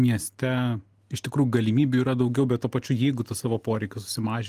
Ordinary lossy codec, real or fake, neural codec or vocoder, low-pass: Opus, 24 kbps; real; none; 14.4 kHz